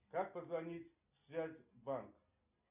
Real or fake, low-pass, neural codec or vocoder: real; 3.6 kHz; none